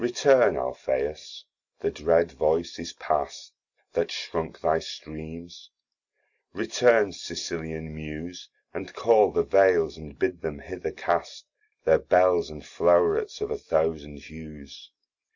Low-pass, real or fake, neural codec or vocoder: 7.2 kHz; real; none